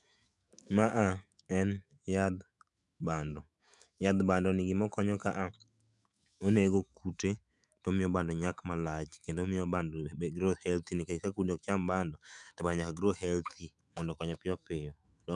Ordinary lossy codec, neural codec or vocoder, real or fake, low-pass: none; autoencoder, 48 kHz, 128 numbers a frame, DAC-VAE, trained on Japanese speech; fake; 10.8 kHz